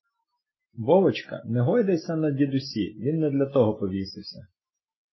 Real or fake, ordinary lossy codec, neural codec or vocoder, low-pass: real; MP3, 24 kbps; none; 7.2 kHz